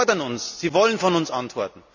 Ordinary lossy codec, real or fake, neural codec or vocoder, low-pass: none; real; none; 7.2 kHz